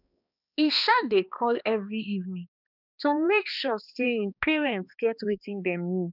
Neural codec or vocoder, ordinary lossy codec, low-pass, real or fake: codec, 16 kHz, 2 kbps, X-Codec, HuBERT features, trained on balanced general audio; none; 5.4 kHz; fake